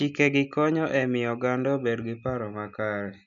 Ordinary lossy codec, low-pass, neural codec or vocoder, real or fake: none; 7.2 kHz; none; real